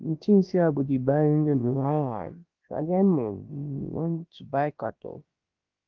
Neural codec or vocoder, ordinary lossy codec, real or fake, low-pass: codec, 16 kHz, about 1 kbps, DyCAST, with the encoder's durations; Opus, 32 kbps; fake; 7.2 kHz